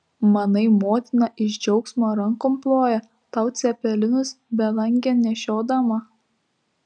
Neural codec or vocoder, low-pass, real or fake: none; 9.9 kHz; real